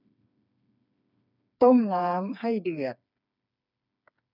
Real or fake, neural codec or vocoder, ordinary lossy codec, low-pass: fake; codec, 16 kHz, 4 kbps, FreqCodec, smaller model; none; 5.4 kHz